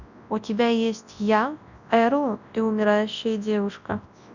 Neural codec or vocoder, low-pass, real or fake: codec, 24 kHz, 0.9 kbps, WavTokenizer, large speech release; 7.2 kHz; fake